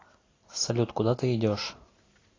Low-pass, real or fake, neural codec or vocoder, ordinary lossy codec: 7.2 kHz; real; none; AAC, 32 kbps